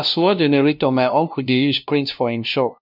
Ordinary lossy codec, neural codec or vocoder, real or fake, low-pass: none; codec, 16 kHz, 0.5 kbps, FunCodec, trained on LibriTTS, 25 frames a second; fake; 5.4 kHz